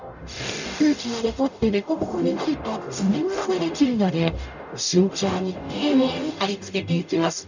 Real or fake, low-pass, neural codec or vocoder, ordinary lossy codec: fake; 7.2 kHz; codec, 44.1 kHz, 0.9 kbps, DAC; none